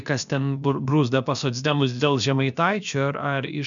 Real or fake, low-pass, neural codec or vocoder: fake; 7.2 kHz; codec, 16 kHz, about 1 kbps, DyCAST, with the encoder's durations